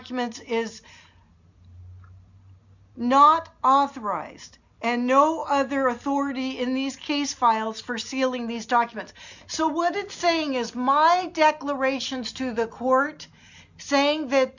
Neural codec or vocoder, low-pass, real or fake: none; 7.2 kHz; real